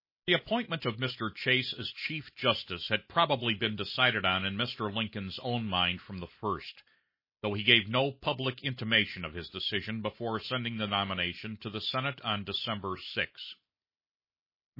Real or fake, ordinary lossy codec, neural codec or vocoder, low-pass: real; MP3, 24 kbps; none; 5.4 kHz